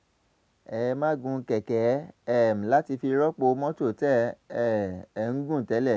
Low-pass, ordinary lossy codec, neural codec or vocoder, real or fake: none; none; none; real